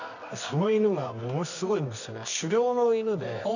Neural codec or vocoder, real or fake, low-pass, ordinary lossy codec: codec, 24 kHz, 0.9 kbps, WavTokenizer, medium music audio release; fake; 7.2 kHz; none